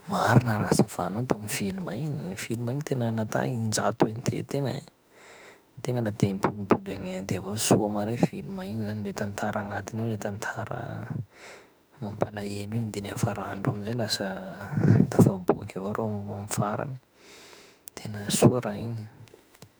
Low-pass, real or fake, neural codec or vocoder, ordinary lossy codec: none; fake; autoencoder, 48 kHz, 32 numbers a frame, DAC-VAE, trained on Japanese speech; none